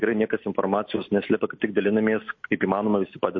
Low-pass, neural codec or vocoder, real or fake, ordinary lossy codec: 7.2 kHz; none; real; MP3, 32 kbps